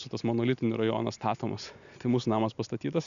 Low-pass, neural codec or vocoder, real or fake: 7.2 kHz; none; real